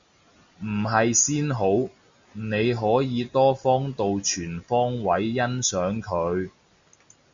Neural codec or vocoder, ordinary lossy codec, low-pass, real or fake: none; Opus, 64 kbps; 7.2 kHz; real